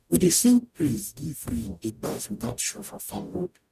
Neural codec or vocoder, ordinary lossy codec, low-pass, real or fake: codec, 44.1 kHz, 0.9 kbps, DAC; none; 14.4 kHz; fake